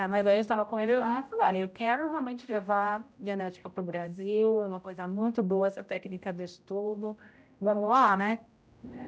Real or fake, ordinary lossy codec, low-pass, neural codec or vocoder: fake; none; none; codec, 16 kHz, 0.5 kbps, X-Codec, HuBERT features, trained on general audio